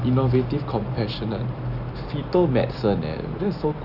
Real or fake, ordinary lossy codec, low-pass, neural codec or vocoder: real; none; 5.4 kHz; none